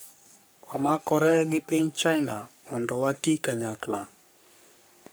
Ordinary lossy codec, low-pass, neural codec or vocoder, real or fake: none; none; codec, 44.1 kHz, 3.4 kbps, Pupu-Codec; fake